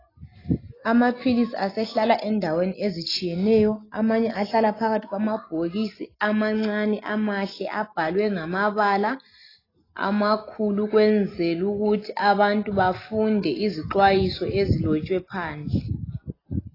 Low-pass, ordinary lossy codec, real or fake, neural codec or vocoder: 5.4 kHz; AAC, 24 kbps; real; none